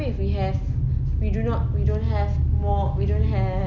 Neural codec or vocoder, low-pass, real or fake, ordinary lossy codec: none; 7.2 kHz; real; none